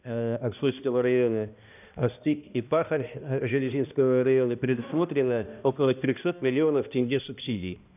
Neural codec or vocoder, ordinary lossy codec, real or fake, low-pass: codec, 16 kHz, 1 kbps, X-Codec, HuBERT features, trained on balanced general audio; none; fake; 3.6 kHz